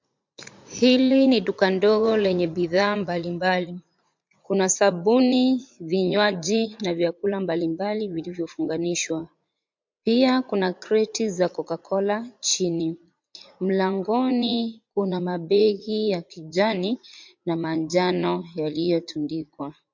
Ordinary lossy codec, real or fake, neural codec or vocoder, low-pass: MP3, 48 kbps; fake; vocoder, 44.1 kHz, 80 mel bands, Vocos; 7.2 kHz